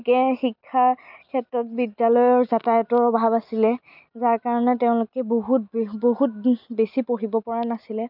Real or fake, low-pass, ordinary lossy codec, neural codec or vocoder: real; 5.4 kHz; none; none